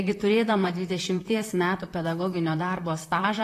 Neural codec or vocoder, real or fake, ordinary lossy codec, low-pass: vocoder, 44.1 kHz, 128 mel bands, Pupu-Vocoder; fake; AAC, 48 kbps; 14.4 kHz